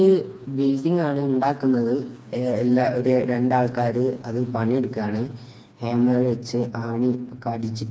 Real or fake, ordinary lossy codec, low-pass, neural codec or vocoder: fake; none; none; codec, 16 kHz, 2 kbps, FreqCodec, smaller model